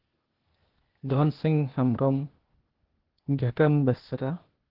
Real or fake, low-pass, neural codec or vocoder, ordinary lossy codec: fake; 5.4 kHz; codec, 16 kHz, 0.8 kbps, ZipCodec; Opus, 16 kbps